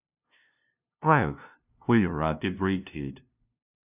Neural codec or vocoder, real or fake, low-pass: codec, 16 kHz, 0.5 kbps, FunCodec, trained on LibriTTS, 25 frames a second; fake; 3.6 kHz